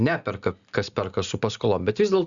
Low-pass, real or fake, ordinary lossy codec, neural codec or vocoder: 7.2 kHz; real; Opus, 64 kbps; none